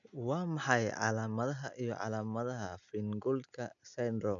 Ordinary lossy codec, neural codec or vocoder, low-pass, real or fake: none; none; 7.2 kHz; real